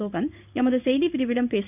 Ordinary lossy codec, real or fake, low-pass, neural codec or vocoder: none; fake; 3.6 kHz; codec, 16 kHz in and 24 kHz out, 1 kbps, XY-Tokenizer